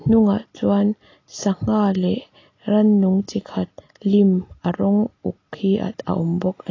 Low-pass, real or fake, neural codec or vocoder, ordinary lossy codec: 7.2 kHz; real; none; AAC, 32 kbps